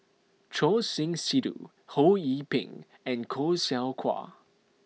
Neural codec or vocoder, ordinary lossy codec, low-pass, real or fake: none; none; none; real